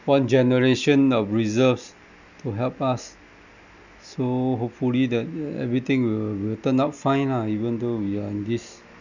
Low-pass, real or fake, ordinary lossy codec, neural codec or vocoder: 7.2 kHz; real; none; none